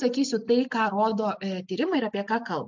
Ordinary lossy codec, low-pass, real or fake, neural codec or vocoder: MP3, 64 kbps; 7.2 kHz; real; none